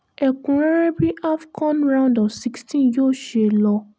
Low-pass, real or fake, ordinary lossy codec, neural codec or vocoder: none; real; none; none